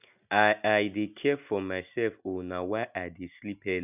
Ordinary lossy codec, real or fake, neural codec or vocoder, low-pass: none; real; none; 3.6 kHz